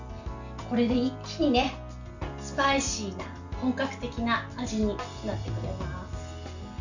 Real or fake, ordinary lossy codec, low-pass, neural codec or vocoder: real; none; 7.2 kHz; none